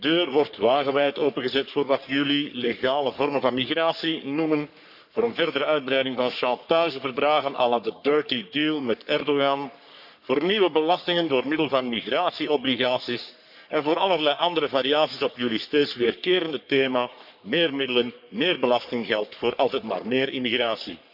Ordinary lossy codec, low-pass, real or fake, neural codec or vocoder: none; 5.4 kHz; fake; codec, 44.1 kHz, 3.4 kbps, Pupu-Codec